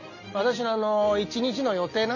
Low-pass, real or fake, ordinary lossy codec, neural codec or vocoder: 7.2 kHz; real; none; none